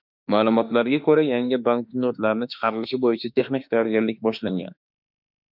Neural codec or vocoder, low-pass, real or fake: autoencoder, 48 kHz, 32 numbers a frame, DAC-VAE, trained on Japanese speech; 5.4 kHz; fake